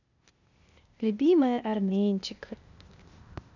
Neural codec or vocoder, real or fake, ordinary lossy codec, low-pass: codec, 16 kHz, 0.8 kbps, ZipCodec; fake; none; 7.2 kHz